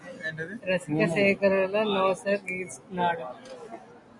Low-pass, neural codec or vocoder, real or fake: 10.8 kHz; none; real